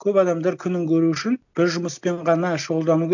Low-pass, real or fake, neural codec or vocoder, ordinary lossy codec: 7.2 kHz; real; none; none